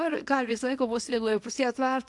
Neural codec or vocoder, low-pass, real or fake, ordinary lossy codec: codec, 24 kHz, 0.9 kbps, WavTokenizer, small release; 10.8 kHz; fake; AAC, 64 kbps